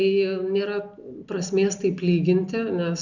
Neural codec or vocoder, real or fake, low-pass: none; real; 7.2 kHz